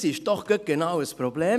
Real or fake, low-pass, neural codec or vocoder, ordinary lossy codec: real; 14.4 kHz; none; none